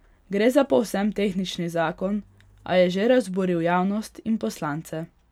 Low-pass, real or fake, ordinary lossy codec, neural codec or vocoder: 19.8 kHz; real; none; none